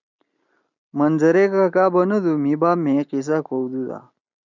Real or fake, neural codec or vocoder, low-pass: real; none; 7.2 kHz